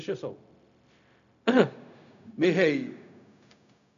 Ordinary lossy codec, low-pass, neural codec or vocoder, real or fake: none; 7.2 kHz; codec, 16 kHz, 0.4 kbps, LongCat-Audio-Codec; fake